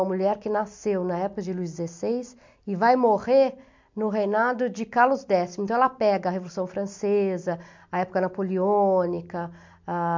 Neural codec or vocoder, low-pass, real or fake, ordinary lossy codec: none; 7.2 kHz; real; MP3, 64 kbps